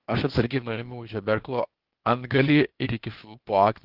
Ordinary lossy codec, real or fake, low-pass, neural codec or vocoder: Opus, 16 kbps; fake; 5.4 kHz; codec, 16 kHz, 0.8 kbps, ZipCodec